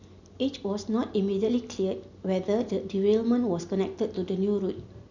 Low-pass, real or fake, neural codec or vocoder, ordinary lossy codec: 7.2 kHz; real; none; none